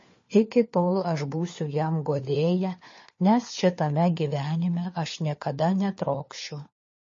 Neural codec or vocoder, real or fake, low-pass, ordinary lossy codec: codec, 16 kHz, 4 kbps, FunCodec, trained on LibriTTS, 50 frames a second; fake; 7.2 kHz; MP3, 32 kbps